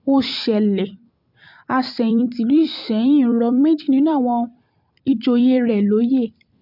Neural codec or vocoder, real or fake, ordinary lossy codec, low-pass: vocoder, 44.1 kHz, 128 mel bands every 256 samples, BigVGAN v2; fake; MP3, 48 kbps; 5.4 kHz